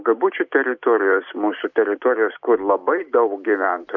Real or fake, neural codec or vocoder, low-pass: real; none; 7.2 kHz